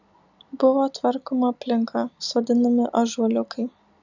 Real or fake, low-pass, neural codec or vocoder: real; 7.2 kHz; none